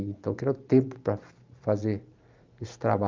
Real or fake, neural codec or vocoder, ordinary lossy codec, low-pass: fake; vocoder, 44.1 kHz, 128 mel bands every 512 samples, BigVGAN v2; Opus, 24 kbps; 7.2 kHz